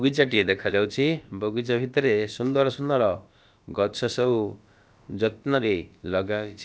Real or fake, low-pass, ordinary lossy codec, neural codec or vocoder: fake; none; none; codec, 16 kHz, about 1 kbps, DyCAST, with the encoder's durations